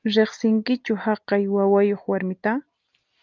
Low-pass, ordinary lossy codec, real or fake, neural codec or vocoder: 7.2 kHz; Opus, 32 kbps; real; none